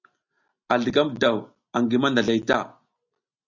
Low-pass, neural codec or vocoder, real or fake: 7.2 kHz; none; real